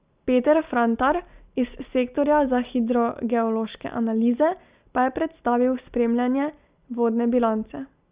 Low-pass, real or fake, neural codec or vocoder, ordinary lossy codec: 3.6 kHz; real; none; Opus, 64 kbps